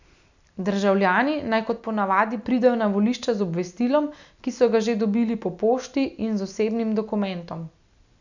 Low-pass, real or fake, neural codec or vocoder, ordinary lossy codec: 7.2 kHz; real; none; none